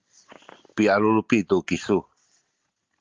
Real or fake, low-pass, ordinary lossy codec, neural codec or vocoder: real; 7.2 kHz; Opus, 32 kbps; none